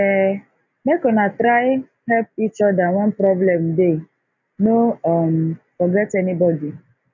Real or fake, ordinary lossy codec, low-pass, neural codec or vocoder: real; none; 7.2 kHz; none